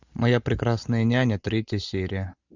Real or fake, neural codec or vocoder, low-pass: real; none; 7.2 kHz